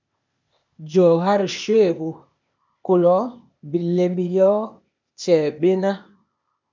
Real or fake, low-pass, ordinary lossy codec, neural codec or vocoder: fake; 7.2 kHz; MP3, 64 kbps; codec, 16 kHz, 0.8 kbps, ZipCodec